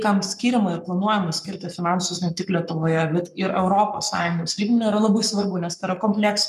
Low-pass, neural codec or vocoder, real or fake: 14.4 kHz; codec, 44.1 kHz, 7.8 kbps, Pupu-Codec; fake